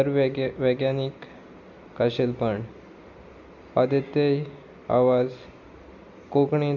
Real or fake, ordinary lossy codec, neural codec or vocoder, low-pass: real; none; none; 7.2 kHz